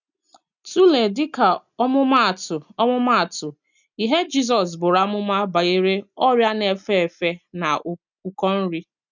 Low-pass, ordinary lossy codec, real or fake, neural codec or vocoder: 7.2 kHz; none; real; none